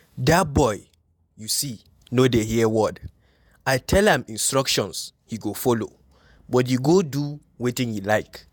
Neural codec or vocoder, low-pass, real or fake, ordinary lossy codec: vocoder, 48 kHz, 128 mel bands, Vocos; none; fake; none